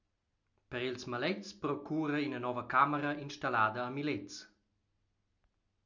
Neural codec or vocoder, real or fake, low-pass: none; real; 7.2 kHz